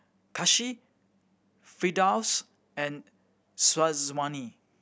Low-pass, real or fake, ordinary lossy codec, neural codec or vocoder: none; real; none; none